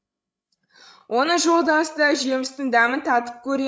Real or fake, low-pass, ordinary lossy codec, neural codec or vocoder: fake; none; none; codec, 16 kHz, 16 kbps, FreqCodec, larger model